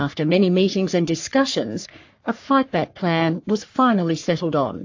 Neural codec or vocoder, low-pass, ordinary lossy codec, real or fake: codec, 44.1 kHz, 3.4 kbps, Pupu-Codec; 7.2 kHz; AAC, 48 kbps; fake